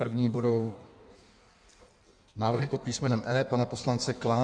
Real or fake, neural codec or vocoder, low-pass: fake; codec, 16 kHz in and 24 kHz out, 1.1 kbps, FireRedTTS-2 codec; 9.9 kHz